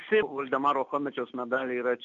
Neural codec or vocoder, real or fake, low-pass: none; real; 7.2 kHz